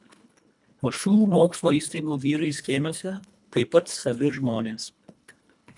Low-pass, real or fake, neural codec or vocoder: 10.8 kHz; fake; codec, 24 kHz, 1.5 kbps, HILCodec